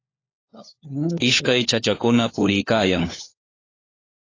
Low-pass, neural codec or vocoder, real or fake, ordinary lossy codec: 7.2 kHz; codec, 16 kHz, 4 kbps, FunCodec, trained on LibriTTS, 50 frames a second; fake; AAC, 32 kbps